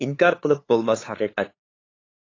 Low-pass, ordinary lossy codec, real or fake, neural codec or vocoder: 7.2 kHz; AAC, 32 kbps; fake; codec, 16 kHz, 2 kbps, FunCodec, trained on LibriTTS, 25 frames a second